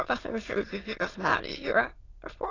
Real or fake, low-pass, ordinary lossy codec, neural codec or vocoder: fake; 7.2 kHz; AAC, 32 kbps; autoencoder, 22.05 kHz, a latent of 192 numbers a frame, VITS, trained on many speakers